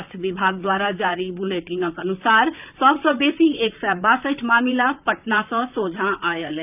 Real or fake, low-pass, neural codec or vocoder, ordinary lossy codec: fake; 3.6 kHz; vocoder, 44.1 kHz, 128 mel bands, Pupu-Vocoder; none